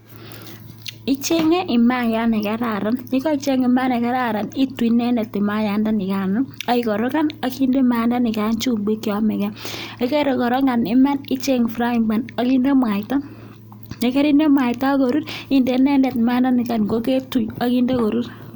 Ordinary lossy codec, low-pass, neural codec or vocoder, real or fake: none; none; none; real